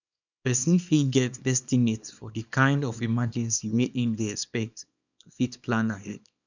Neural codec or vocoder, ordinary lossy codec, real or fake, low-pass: codec, 24 kHz, 0.9 kbps, WavTokenizer, small release; none; fake; 7.2 kHz